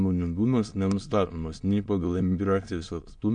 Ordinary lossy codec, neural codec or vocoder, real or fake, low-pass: MP3, 64 kbps; autoencoder, 22.05 kHz, a latent of 192 numbers a frame, VITS, trained on many speakers; fake; 9.9 kHz